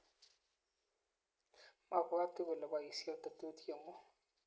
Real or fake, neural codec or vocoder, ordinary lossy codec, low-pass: real; none; none; none